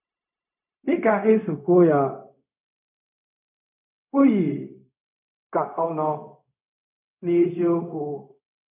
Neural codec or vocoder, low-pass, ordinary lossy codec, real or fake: codec, 16 kHz, 0.4 kbps, LongCat-Audio-Codec; 3.6 kHz; none; fake